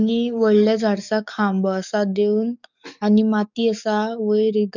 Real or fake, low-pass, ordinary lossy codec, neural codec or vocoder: fake; 7.2 kHz; none; codec, 44.1 kHz, 7.8 kbps, DAC